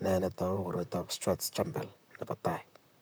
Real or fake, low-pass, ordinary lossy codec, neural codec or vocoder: fake; none; none; vocoder, 44.1 kHz, 128 mel bands, Pupu-Vocoder